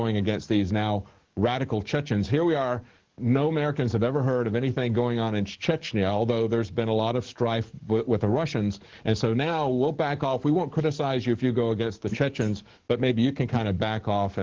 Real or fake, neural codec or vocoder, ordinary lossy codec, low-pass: fake; codec, 44.1 kHz, 7.8 kbps, DAC; Opus, 16 kbps; 7.2 kHz